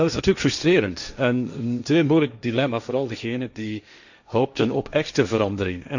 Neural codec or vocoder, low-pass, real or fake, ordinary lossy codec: codec, 16 kHz, 1.1 kbps, Voila-Tokenizer; 7.2 kHz; fake; none